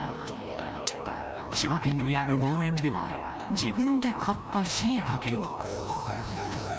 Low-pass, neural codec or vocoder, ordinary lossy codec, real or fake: none; codec, 16 kHz, 1 kbps, FreqCodec, larger model; none; fake